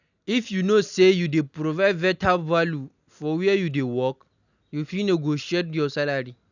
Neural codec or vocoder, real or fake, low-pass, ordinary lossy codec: none; real; 7.2 kHz; none